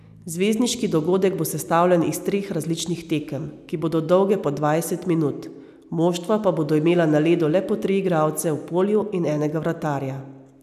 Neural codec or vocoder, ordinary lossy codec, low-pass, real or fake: none; none; 14.4 kHz; real